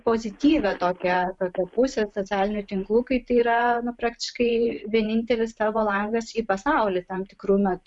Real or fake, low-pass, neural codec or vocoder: fake; 10.8 kHz; vocoder, 44.1 kHz, 128 mel bands every 512 samples, BigVGAN v2